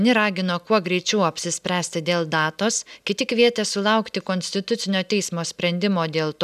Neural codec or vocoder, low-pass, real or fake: none; 14.4 kHz; real